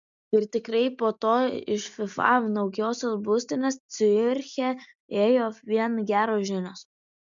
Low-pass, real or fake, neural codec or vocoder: 7.2 kHz; real; none